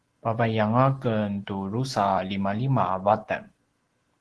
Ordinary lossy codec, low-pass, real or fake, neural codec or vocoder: Opus, 16 kbps; 10.8 kHz; real; none